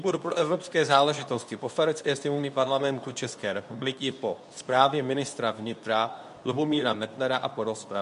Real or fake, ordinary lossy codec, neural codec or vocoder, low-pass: fake; MP3, 64 kbps; codec, 24 kHz, 0.9 kbps, WavTokenizer, medium speech release version 2; 10.8 kHz